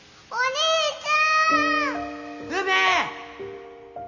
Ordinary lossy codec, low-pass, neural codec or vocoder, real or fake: none; 7.2 kHz; none; real